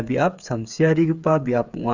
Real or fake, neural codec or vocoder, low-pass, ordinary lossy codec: fake; codec, 16 kHz, 8 kbps, FreqCodec, smaller model; 7.2 kHz; Opus, 64 kbps